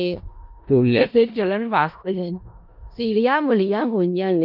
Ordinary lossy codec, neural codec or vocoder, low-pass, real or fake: Opus, 24 kbps; codec, 16 kHz in and 24 kHz out, 0.4 kbps, LongCat-Audio-Codec, four codebook decoder; 5.4 kHz; fake